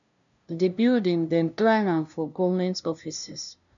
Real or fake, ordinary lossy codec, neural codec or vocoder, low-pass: fake; none; codec, 16 kHz, 0.5 kbps, FunCodec, trained on LibriTTS, 25 frames a second; 7.2 kHz